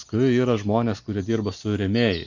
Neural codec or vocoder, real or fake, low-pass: none; real; 7.2 kHz